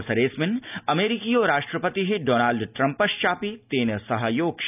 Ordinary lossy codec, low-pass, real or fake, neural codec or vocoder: none; 3.6 kHz; real; none